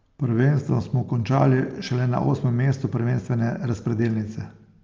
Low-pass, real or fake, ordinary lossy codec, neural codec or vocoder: 7.2 kHz; real; Opus, 24 kbps; none